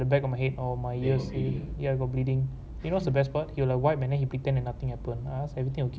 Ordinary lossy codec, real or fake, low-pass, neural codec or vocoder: none; real; none; none